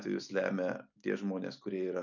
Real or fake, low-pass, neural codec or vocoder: real; 7.2 kHz; none